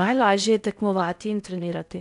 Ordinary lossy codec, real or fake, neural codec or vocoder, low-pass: MP3, 96 kbps; fake; codec, 16 kHz in and 24 kHz out, 0.6 kbps, FocalCodec, streaming, 4096 codes; 10.8 kHz